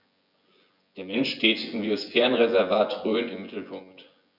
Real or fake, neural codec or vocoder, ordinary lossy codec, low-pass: fake; vocoder, 24 kHz, 100 mel bands, Vocos; none; 5.4 kHz